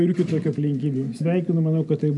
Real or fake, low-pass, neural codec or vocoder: real; 10.8 kHz; none